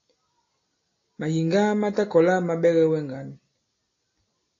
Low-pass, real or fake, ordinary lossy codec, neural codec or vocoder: 7.2 kHz; real; AAC, 32 kbps; none